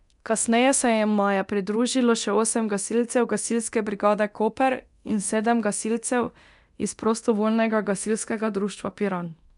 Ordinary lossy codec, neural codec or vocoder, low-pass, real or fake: none; codec, 24 kHz, 0.9 kbps, DualCodec; 10.8 kHz; fake